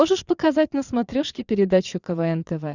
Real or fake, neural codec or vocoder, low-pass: fake; vocoder, 22.05 kHz, 80 mel bands, Vocos; 7.2 kHz